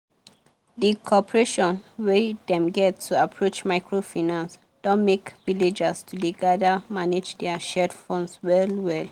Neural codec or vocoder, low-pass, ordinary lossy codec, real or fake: none; 19.8 kHz; Opus, 24 kbps; real